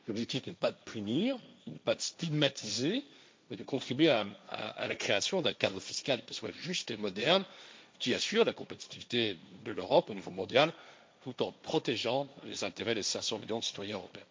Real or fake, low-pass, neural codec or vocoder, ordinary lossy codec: fake; none; codec, 16 kHz, 1.1 kbps, Voila-Tokenizer; none